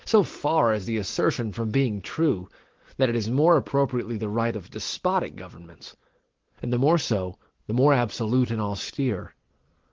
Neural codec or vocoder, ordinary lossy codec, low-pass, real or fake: none; Opus, 16 kbps; 7.2 kHz; real